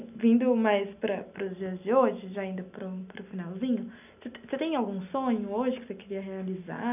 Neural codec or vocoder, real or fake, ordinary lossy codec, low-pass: none; real; none; 3.6 kHz